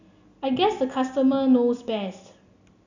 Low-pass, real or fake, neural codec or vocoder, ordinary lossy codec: 7.2 kHz; real; none; none